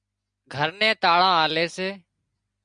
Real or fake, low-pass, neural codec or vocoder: real; 9.9 kHz; none